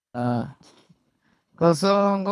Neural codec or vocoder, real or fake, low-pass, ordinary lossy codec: codec, 24 kHz, 3 kbps, HILCodec; fake; none; none